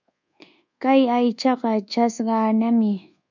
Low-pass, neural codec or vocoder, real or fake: 7.2 kHz; codec, 24 kHz, 1.2 kbps, DualCodec; fake